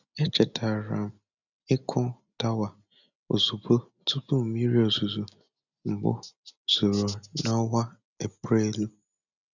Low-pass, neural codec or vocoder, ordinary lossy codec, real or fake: 7.2 kHz; none; none; real